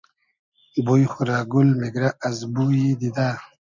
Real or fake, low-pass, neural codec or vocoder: real; 7.2 kHz; none